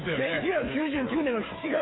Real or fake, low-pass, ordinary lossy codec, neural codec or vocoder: fake; 7.2 kHz; AAC, 16 kbps; codec, 16 kHz, 8 kbps, FreqCodec, smaller model